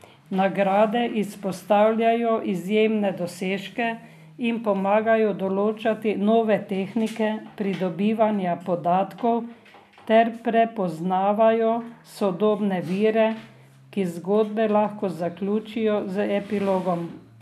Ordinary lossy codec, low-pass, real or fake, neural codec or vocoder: none; 14.4 kHz; real; none